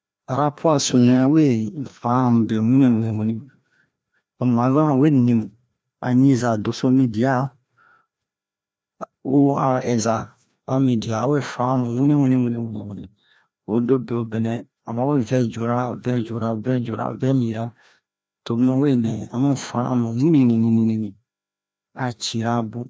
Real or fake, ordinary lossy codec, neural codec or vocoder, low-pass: fake; none; codec, 16 kHz, 1 kbps, FreqCodec, larger model; none